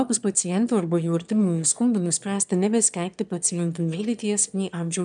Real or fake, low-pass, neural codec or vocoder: fake; 9.9 kHz; autoencoder, 22.05 kHz, a latent of 192 numbers a frame, VITS, trained on one speaker